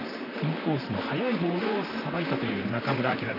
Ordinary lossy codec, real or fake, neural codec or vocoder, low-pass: none; fake; vocoder, 44.1 kHz, 128 mel bands, Pupu-Vocoder; 5.4 kHz